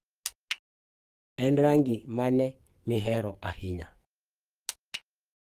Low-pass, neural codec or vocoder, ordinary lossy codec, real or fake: 14.4 kHz; codec, 44.1 kHz, 2.6 kbps, SNAC; Opus, 32 kbps; fake